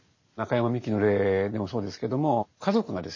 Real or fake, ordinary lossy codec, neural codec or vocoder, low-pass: real; MP3, 32 kbps; none; 7.2 kHz